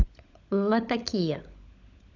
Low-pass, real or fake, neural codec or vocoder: 7.2 kHz; fake; codec, 16 kHz, 16 kbps, FunCodec, trained on LibriTTS, 50 frames a second